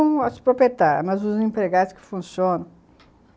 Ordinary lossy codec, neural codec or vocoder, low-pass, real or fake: none; none; none; real